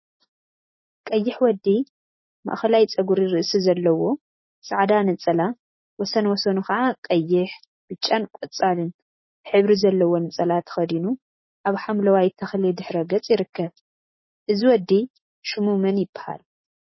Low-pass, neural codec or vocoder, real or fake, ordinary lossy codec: 7.2 kHz; none; real; MP3, 24 kbps